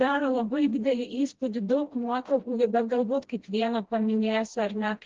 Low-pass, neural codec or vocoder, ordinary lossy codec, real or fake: 7.2 kHz; codec, 16 kHz, 1 kbps, FreqCodec, smaller model; Opus, 16 kbps; fake